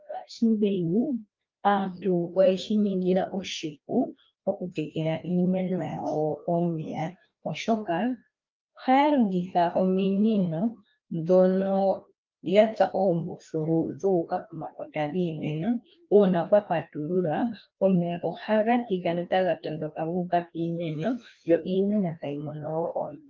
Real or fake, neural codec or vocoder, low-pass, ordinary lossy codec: fake; codec, 16 kHz, 1 kbps, FreqCodec, larger model; 7.2 kHz; Opus, 24 kbps